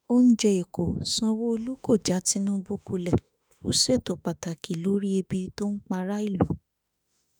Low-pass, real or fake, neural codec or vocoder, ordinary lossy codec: none; fake; autoencoder, 48 kHz, 32 numbers a frame, DAC-VAE, trained on Japanese speech; none